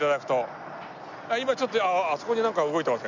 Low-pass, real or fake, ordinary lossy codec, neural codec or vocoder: 7.2 kHz; real; none; none